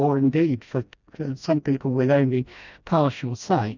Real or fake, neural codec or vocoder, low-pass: fake; codec, 16 kHz, 1 kbps, FreqCodec, smaller model; 7.2 kHz